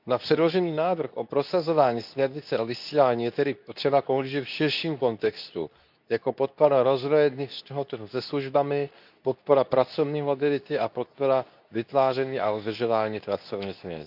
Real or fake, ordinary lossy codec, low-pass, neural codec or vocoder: fake; none; 5.4 kHz; codec, 24 kHz, 0.9 kbps, WavTokenizer, medium speech release version 2